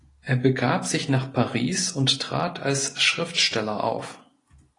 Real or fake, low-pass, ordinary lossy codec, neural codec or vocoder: real; 10.8 kHz; AAC, 32 kbps; none